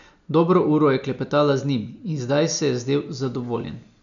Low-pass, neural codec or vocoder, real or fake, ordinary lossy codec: 7.2 kHz; none; real; none